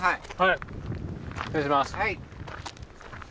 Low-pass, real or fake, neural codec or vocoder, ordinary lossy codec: none; real; none; none